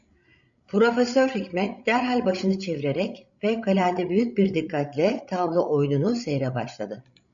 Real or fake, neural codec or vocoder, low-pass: fake; codec, 16 kHz, 16 kbps, FreqCodec, larger model; 7.2 kHz